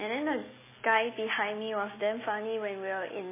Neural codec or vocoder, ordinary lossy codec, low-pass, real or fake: none; MP3, 16 kbps; 3.6 kHz; real